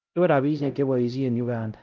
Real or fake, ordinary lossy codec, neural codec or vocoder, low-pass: fake; Opus, 32 kbps; codec, 16 kHz, 0.5 kbps, X-Codec, HuBERT features, trained on LibriSpeech; 7.2 kHz